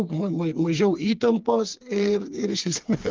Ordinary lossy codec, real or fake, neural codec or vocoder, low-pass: Opus, 32 kbps; fake; codec, 16 kHz, 4 kbps, FreqCodec, smaller model; 7.2 kHz